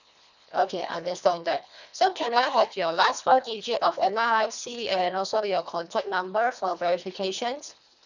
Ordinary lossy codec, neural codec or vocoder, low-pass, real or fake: none; codec, 24 kHz, 1.5 kbps, HILCodec; 7.2 kHz; fake